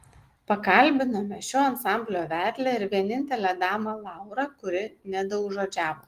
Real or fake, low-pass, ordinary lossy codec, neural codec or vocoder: real; 14.4 kHz; Opus, 24 kbps; none